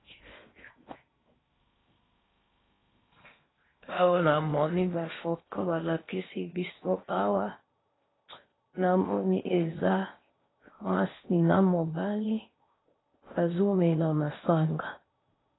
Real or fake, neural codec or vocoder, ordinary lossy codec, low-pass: fake; codec, 16 kHz in and 24 kHz out, 0.6 kbps, FocalCodec, streaming, 4096 codes; AAC, 16 kbps; 7.2 kHz